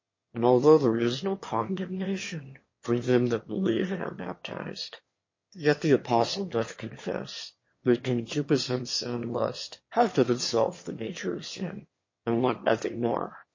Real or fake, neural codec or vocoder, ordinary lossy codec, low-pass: fake; autoencoder, 22.05 kHz, a latent of 192 numbers a frame, VITS, trained on one speaker; MP3, 32 kbps; 7.2 kHz